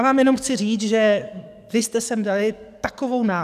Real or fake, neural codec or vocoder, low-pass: fake; codec, 44.1 kHz, 7.8 kbps, DAC; 14.4 kHz